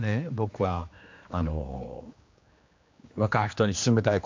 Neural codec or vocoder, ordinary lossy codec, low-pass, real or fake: codec, 16 kHz, 2 kbps, X-Codec, HuBERT features, trained on general audio; MP3, 64 kbps; 7.2 kHz; fake